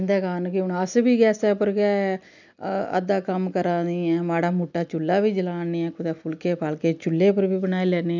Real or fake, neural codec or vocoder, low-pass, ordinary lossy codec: real; none; 7.2 kHz; none